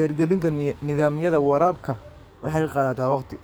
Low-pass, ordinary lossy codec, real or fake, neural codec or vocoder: none; none; fake; codec, 44.1 kHz, 2.6 kbps, SNAC